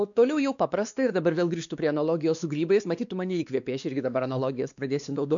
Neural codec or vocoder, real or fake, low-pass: codec, 16 kHz, 2 kbps, X-Codec, WavLM features, trained on Multilingual LibriSpeech; fake; 7.2 kHz